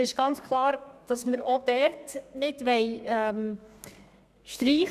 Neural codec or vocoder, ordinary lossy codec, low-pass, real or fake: codec, 32 kHz, 1.9 kbps, SNAC; none; 14.4 kHz; fake